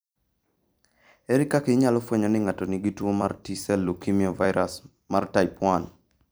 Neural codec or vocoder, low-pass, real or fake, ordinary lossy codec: vocoder, 44.1 kHz, 128 mel bands every 256 samples, BigVGAN v2; none; fake; none